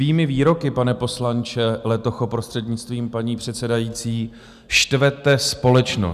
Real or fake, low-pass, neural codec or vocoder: real; 14.4 kHz; none